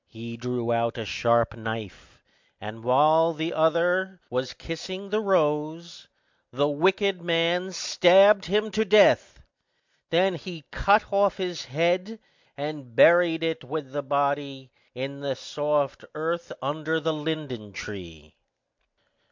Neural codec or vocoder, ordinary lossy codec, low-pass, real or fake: none; MP3, 64 kbps; 7.2 kHz; real